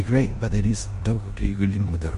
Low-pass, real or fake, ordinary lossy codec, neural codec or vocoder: 10.8 kHz; fake; MP3, 48 kbps; codec, 16 kHz in and 24 kHz out, 0.9 kbps, LongCat-Audio-Codec, four codebook decoder